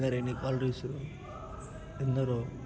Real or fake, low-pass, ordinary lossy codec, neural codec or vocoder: real; none; none; none